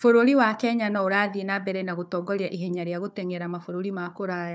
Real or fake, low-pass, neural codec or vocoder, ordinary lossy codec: fake; none; codec, 16 kHz, 4 kbps, FunCodec, trained on Chinese and English, 50 frames a second; none